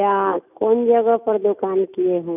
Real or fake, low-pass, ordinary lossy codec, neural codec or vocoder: real; 3.6 kHz; none; none